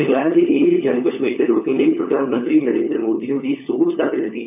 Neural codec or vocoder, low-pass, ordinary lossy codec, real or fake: codec, 16 kHz, 4 kbps, FunCodec, trained on LibriTTS, 50 frames a second; 3.6 kHz; none; fake